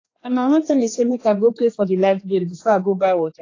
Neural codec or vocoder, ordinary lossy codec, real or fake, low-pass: codec, 16 kHz, 2 kbps, X-Codec, HuBERT features, trained on general audio; AAC, 32 kbps; fake; 7.2 kHz